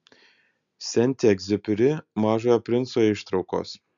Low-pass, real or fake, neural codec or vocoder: 7.2 kHz; real; none